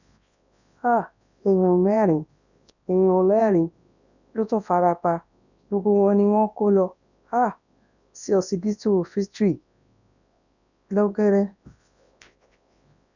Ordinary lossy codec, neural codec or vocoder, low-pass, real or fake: none; codec, 24 kHz, 0.9 kbps, WavTokenizer, large speech release; 7.2 kHz; fake